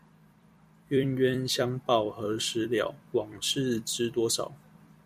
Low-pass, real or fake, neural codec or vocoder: 14.4 kHz; fake; vocoder, 44.1 kHz, 128 mel bands every 256 samples, BigVGAN v2